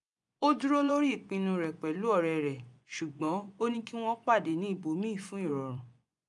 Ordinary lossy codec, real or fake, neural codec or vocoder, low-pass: none; fake; vocoder, 24 kHz, 100 mel bands, Vocos; 10.8 kHz